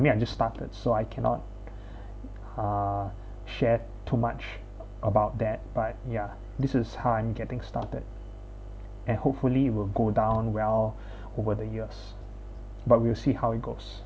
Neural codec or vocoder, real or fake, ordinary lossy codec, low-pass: none; real; none; none